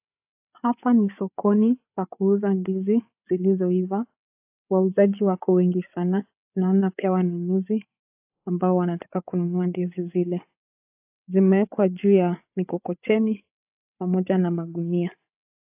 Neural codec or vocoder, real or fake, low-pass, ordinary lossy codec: codec, 16 kHz, 8 kbps, FreqCodec, larger model; fake; 3.6 kHz; AAC, 32 kbps